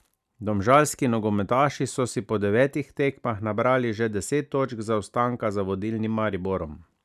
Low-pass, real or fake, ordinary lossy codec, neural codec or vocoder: 14.4 kHz; real; none; none